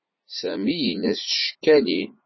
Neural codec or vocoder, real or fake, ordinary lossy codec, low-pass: vocoder, 44.1 kHz, 80 mel bands, Vocos; fake; MP3, 24 kbps; 7.2 kHz